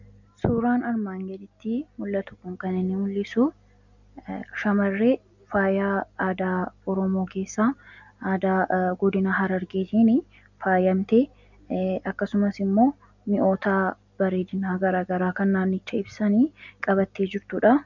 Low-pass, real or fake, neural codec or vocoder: 7.2 kHz; real; none